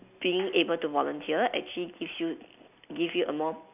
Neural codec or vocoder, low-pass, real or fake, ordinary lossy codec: none; 3.6 kHz; real; none